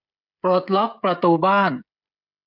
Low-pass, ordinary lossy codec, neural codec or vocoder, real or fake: 5.4 kHz; none; codec, 16 kHz, 16 kbps, FreqCodec, smaller model; fake